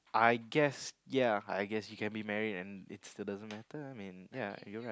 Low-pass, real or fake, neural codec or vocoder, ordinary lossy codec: none; real; none; none